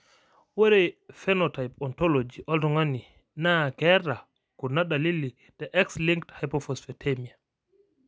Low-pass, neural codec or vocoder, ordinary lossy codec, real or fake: none; none; none; real